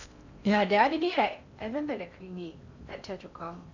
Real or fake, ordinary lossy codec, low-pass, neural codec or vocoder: fake; none; 7.2 kHz; codec, 16 kHz in and 24 kHz out, 0.6 kbps, FocalCodec, streaming, 4096 codes